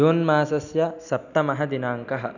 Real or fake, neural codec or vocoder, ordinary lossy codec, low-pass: real; none; none; 7.2 kHz